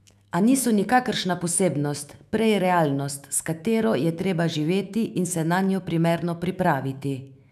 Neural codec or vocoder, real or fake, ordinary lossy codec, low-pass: autoencoder, 48 kHz, 128 numbers a frame, DAC-VAE, trained on Japanese speech; fake; none; 14.4 kHz